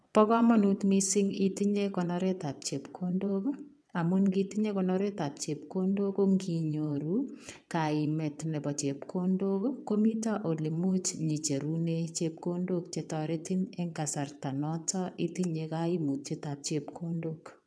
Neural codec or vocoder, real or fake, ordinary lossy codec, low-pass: vocoder, 22.05 kHz, 80 mel bands, WaveNeXt; fake; none; none